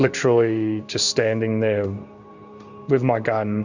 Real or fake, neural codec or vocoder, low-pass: fake; codec, 16 kHz in and 24 kHz out, 1 kbps, XY-Tokenizer; 7.2 kHz